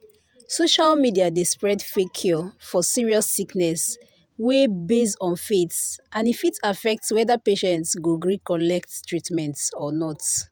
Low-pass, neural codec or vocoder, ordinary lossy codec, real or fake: none; vocoder, 48 kHz, 128 mel bands, Vocos; none; fake